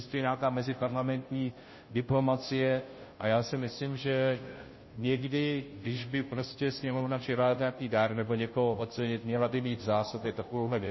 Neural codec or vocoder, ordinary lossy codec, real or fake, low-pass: codec, 16 kHz, 0.5 kbps, FunCodec, trained on Chinese and English, 25 frames a second; MP3, 24 kbps; fake; 7.2 kHz